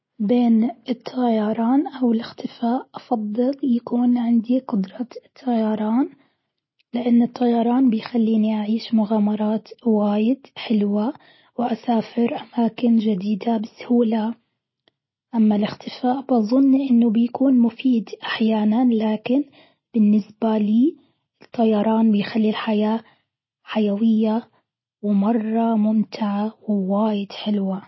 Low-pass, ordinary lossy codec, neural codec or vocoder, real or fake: 7.2 kHz; MP3, 24 kbps; none; real